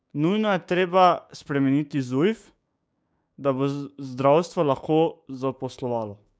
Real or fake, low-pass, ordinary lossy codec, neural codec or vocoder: fake; none; none; codec, 16 kHz, 6 kbps, DAC